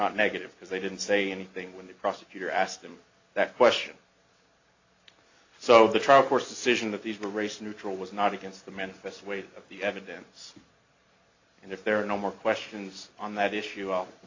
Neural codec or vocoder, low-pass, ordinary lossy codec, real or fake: none; 7.2 kHz; AAC, 48 kbps; real